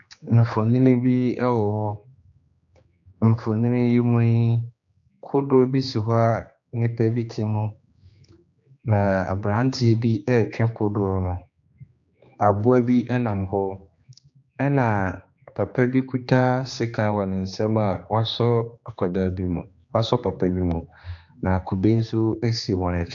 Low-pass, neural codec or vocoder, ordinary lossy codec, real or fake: 7.2 kHz; codec, 16 kHz, 2 kbps, X-Codec, HuBERT features, trained on general audio; AAC, 64 kbps; fake